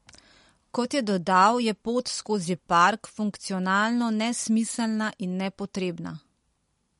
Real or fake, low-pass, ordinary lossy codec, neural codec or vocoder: real; 19.8 kHz; MP3, 48 kbps; none